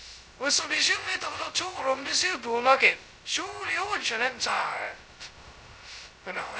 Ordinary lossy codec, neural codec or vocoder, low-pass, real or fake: none; codec, 16 kHz, 0.2 kbps, FocalCodec; none; fake